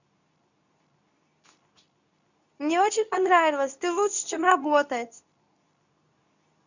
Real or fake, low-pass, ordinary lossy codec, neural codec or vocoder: fake; 7.2 kHz; none; codec, 24 kHz, 0.9 kbps, WavTokenizer, medium speech release version 2